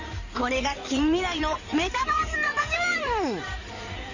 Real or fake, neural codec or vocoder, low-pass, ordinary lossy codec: fake; codec, 16 kHz in and 24 kHz out, 2.2 kbps, FireRedTTS-2 codec; 7.2 kHz; none